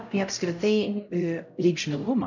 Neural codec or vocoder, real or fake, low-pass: codec, 16 kHz, 0.5 kbps, X-Codec, HuBERT features, trained on LibriSpeech; fake; 7.2 kHz